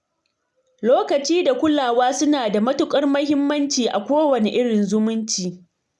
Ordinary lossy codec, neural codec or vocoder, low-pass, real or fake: none; none; none; real